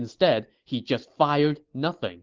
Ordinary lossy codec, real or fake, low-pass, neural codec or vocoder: Opus, 16 kbps; real; 7.2 kHz; none